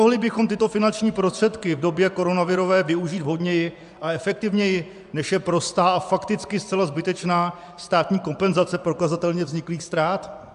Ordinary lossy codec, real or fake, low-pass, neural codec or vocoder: AAC, 96 kbps; fake; 10.8 kHz; vocoder, 24 kHz, 100 mel bands, Vocos